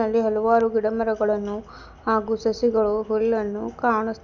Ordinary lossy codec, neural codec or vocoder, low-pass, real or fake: none; none; 7.2 kHz; real